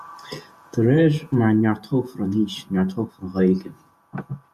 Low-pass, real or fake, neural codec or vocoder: 14.4 kHz; fake; vocoder, 48 kHz, 128 mel bands, Vocos